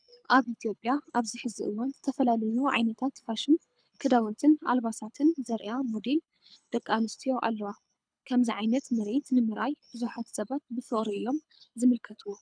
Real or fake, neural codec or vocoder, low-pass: fake; codec, 24 kHz, 6 kbps, HILCodec; 9.9 kHz